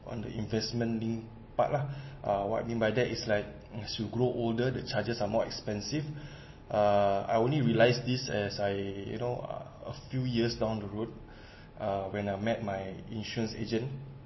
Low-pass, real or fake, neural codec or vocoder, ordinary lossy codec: 7.2 kHz; real; none; MP3, 24 kbps